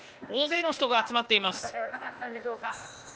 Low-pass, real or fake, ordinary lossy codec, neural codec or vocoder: none; fake; none; codec, 16 kHz, 0.8 kbps, ZipCodec